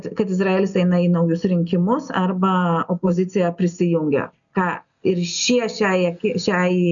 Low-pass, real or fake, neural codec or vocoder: 7.2 kHz; real; none